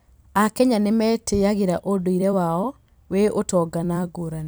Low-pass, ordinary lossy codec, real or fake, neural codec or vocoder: none; none; fake; vocoder, 44.1 kHz, 128 mel bands every 256 samples, BigVGAN v2